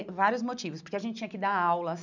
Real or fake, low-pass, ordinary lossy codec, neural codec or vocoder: real; 7.2 kHz; none; none